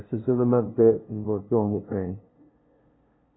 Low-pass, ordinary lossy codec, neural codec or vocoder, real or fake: 7.2 kHz; AAC, 16 kbps; codec, 16 kHz, 0.5 kbps, FunCodec, trained on LibriTTS, 25 frames a second; fake